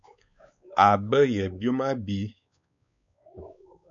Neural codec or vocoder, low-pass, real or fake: codec, 16 kHz, 4 kbps, X-Codec, WavLM features, trained on Multilingual LibriSpeech; 7.2 kHz; fake